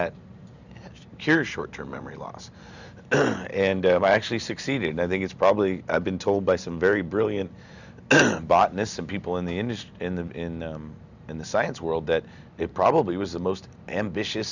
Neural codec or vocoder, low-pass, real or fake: none; 7.2 kHz; real